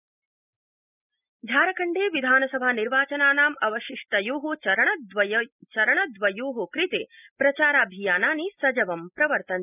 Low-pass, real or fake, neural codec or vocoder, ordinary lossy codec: 3.6 kHz; real; none; none